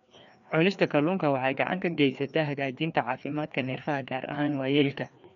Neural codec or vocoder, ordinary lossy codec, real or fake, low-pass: codec, 16 kHz, 2 kbps, FreqCodec, larger model; none; fake; 7.2 kHz